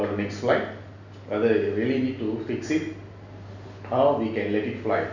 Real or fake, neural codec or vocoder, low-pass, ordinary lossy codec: real; none; 7.2 kHz; none